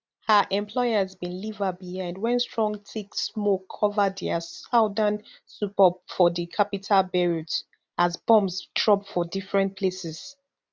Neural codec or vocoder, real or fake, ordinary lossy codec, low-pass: none; real; none; none